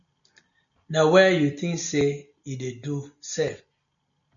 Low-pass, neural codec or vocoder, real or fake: 7.2 kHz; none; real